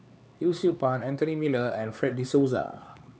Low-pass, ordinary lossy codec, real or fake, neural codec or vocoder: none; none; fake; codec, 16 kHz, 4 kbps, X-Codec, HuBERT features, trained on LibriSpeech